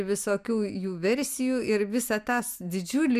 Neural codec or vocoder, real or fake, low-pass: none; real; 14.4 kHz